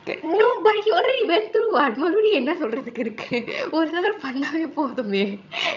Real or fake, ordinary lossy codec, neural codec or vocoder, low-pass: fake; none; vocoder, 22.05 kHz, 80 mel bands, HiFi-GAN; 7.2 kHz